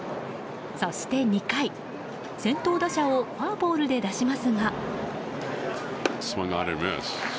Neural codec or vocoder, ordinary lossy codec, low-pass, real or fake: none; none; none; real